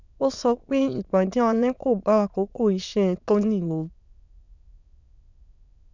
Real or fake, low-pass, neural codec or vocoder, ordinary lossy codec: fake; 7.2 kHz; autoencoder, 22.05 kHz, a latent of 192 numbers a frame, VITS, trained on many speakers; none